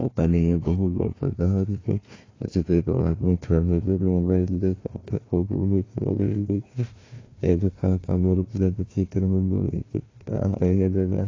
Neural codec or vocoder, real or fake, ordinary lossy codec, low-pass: codec, 16 kHz, 1 kbps, FunCodec, trained on Chinese and English, 50 frames a second; fake; AAC, 32 kbps; 7.2 kHz